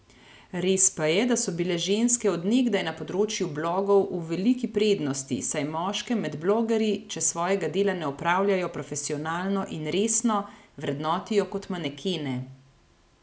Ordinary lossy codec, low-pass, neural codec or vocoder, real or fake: none; none; none; real